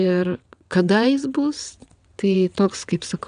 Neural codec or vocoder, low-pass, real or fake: vocoder, 22.05 kHz, 80 mel bands, WaveNeXt; 9.9 kHz; fake